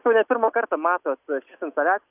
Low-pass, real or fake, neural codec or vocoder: 3.6 kHz; real; none